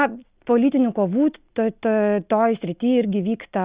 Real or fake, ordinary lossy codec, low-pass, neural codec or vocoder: real; Opus, 64 kbps; 3.6 kHz; none